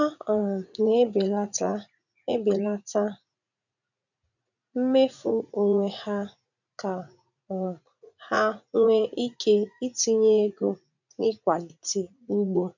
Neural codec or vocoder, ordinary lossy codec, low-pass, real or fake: vocoder, 44.1 kHz, 80 mel bands, Vocos; none; 7.2 kHz; fake